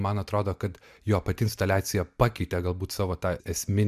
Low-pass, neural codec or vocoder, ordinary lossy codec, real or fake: 14.4 kHz; none; MP3, 96 kbps; real